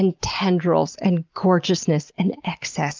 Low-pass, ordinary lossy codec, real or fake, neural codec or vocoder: 7.2 kHz; Opus, 24 kbps; real; none